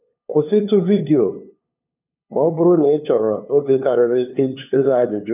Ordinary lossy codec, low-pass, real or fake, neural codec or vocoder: none; 3.6 kHz; fake; codec, 16 kHz, 2 kbps, FunCodec, trained on LibriTTS, 25 frames a second